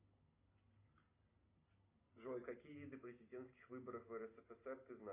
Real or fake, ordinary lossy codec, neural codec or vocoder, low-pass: real; AAC, 24 kbps; none; 3.6 kHz